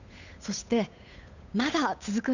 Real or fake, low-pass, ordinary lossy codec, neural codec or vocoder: fake; 7.2 kHz; none; codec, 16 kHz, 8 kbps, FunCodec, trained on Chinese and English, 25 frames a second